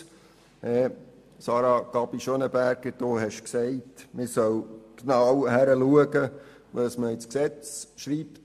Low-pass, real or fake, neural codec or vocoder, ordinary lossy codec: 14.4 kHz; real; none; MP3, 64 kbps